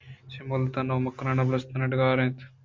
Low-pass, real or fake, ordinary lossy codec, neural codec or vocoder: 7.2 kHz; real; MP3, 48 kbps; none